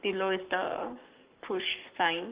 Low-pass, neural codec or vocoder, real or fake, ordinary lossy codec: 3.6 kHz; codec, 44.1 kHz, 7.8 kbps, Pupu-Codec; fake; Opus, 24 kbps